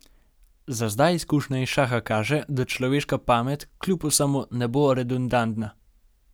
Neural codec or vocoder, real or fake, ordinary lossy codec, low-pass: none; real; none; none